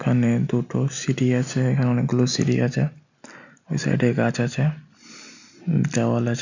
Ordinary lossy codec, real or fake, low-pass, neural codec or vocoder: none; real; 7.2 kHz; none